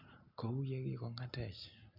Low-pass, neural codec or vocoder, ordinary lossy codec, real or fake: 5.4 kHz; none; none; real